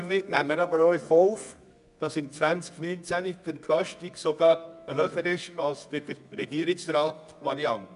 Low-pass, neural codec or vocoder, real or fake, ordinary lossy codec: 10.8 kHz; codec, 24 kHz, 0.9 kbps, WavTokenizer, medium music audio release; fake; MP3, 96 kbps